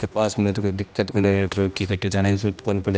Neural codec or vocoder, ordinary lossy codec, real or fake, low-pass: codec, 16 kHz, 1 kbps, X-Codec, HuBERT features, trained on general audio; none; fake; none